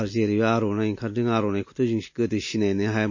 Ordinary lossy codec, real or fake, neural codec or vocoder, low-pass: MP3, 32 kbps; real; none; 7.2 kHz